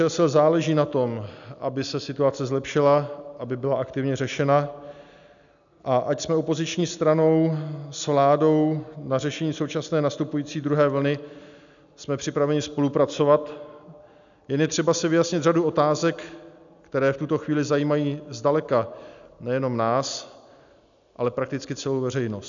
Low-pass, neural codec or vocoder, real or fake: 7.2 kHz; none; real